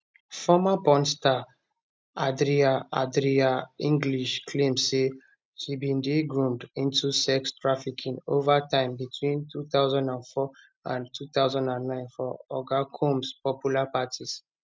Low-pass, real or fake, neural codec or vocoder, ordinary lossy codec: none; real; none; none